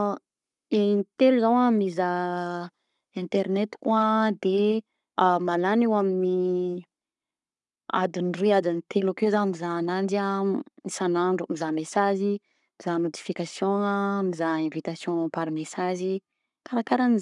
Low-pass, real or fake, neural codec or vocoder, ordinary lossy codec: 10.8 kHz; fake; codec, 44.1 kHz, 7.8 kbps, Pupu-Codec; none